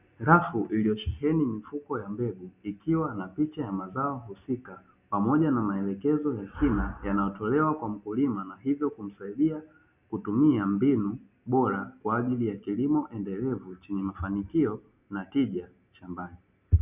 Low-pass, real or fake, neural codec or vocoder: 3.6 kHz; real; none